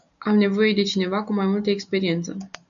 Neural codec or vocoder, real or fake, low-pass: none; real; 7.2 kHz